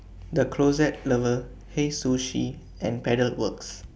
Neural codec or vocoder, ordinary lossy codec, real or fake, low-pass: none; none; real; none